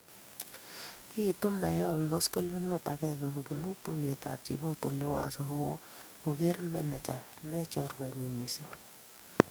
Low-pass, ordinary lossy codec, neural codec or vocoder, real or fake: none; none; codec, 44.1 kHz, 2.6 kbps, DAC; fake